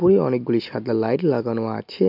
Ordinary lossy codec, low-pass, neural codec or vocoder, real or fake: MP3, 48 kbps; 5.4 kHz; none; real